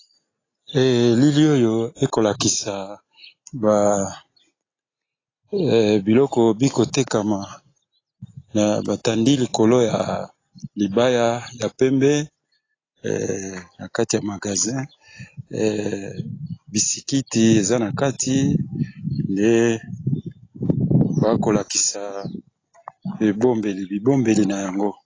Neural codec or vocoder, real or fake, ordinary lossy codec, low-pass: none; real; AAC, 32 kbps; 7.2 kHz